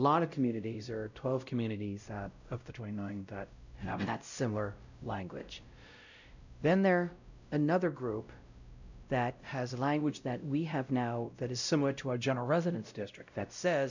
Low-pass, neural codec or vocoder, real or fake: 7.2 kHz; codec, 16 kHz, 0.5 kbps, X-Codec, WavLM features, trained on Multilingual LibriSpeech; fake